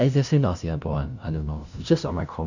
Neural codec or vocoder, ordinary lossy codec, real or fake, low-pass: codec, 16 kHz, 0.5 kbps, FunCodec, trained on LibriTTS, 25 frames a second; none; fake; 7.2 kHz